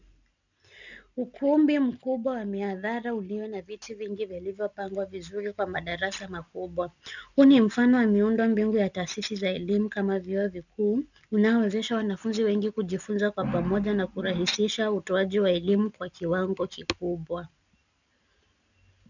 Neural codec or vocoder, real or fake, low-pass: none; real; 7.2 kHz